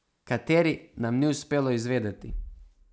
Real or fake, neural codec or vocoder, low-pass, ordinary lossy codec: real; none; none; none